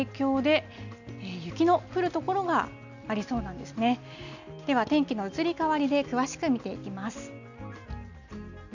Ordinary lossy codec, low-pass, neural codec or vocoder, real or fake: none; 7.2 kHz; none; real